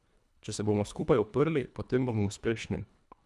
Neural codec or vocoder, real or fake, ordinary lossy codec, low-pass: codec, 24 kHz, 1.5 kbps, HILCodec; fake; none; none